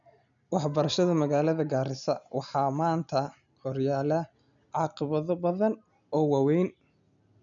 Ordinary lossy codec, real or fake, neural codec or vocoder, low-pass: none; real; none; 7.2 kHz